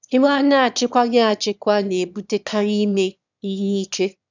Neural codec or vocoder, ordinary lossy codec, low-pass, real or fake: autoencoder, 22.05 kHz, a latent of 192 numbers a frame, VITS, trained on one speaker; none; 7.2 kHz; fake